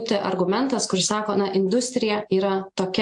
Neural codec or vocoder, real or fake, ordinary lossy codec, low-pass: none; real; AAC, 64 kbps; 10.8 kHz